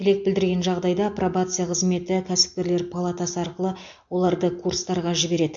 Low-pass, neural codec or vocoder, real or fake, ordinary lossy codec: 7.2 kHz; none; real; MP3, 48 kbps